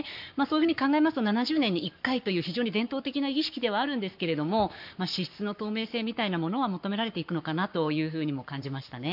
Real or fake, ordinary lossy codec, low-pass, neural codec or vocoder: fake; MP3, 48 kbps; 5.4 kHz; codec, 44.1 kHz, 7.8 kbps, Pupu-Codec